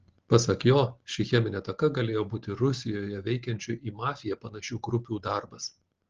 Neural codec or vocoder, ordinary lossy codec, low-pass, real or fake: none; Opus, 16 kbps; 7.2 kHz; real